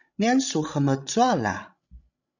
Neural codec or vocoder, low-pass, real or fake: codec, 16 kHz, 16 kbps, FreqCodec, larger model; 7.2 kHz; fake